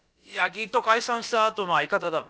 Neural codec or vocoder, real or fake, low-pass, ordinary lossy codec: codec, 16 kHz, about 1 kbps, DyCAST, with the encoder's durations; fake; none; none